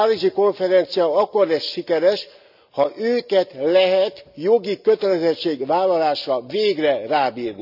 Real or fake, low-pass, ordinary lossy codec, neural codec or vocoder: real; 5.4 kHz; none; none